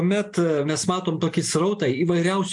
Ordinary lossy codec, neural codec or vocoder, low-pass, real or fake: MP3, 64 kbps; none; 10.8 kHz; real